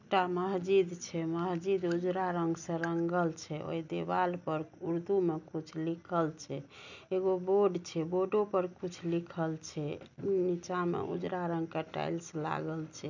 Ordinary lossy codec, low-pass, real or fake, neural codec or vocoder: none; 7.2 kHz; real; none